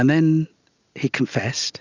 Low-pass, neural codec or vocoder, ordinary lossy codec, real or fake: 7.2 kHz; none; Opus, 64 kbps; real